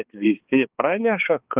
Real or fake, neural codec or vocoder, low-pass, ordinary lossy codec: fake; codec, 16 kHz, 2 kbps, X-Codec, HuBERT features, trained on balanced general audio; 3.6 kHz; Opus, 32 kbps